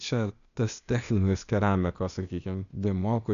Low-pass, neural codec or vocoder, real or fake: 7.2 kHz; codec, 16 kHz, 0.8 kbps, ZipCodec; fake